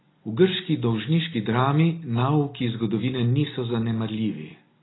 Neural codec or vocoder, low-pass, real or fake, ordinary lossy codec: none; 7.2 kHz; real; AAC, 16 kbps